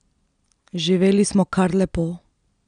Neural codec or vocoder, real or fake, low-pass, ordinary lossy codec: none; real; 9.9 kHz; none